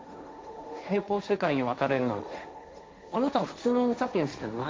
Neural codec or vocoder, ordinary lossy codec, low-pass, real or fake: codec, 16 kHz, 1.1 kbps, Voila-Tokenizer; none; none; fake